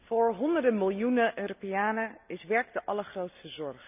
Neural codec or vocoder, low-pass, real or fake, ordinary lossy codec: none; 3.6 kHz; real; none